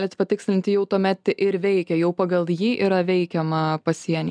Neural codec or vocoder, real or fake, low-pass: none; real; 9.9 kHz